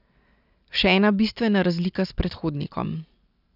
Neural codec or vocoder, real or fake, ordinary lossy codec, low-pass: none; real; none; 5.4 kHz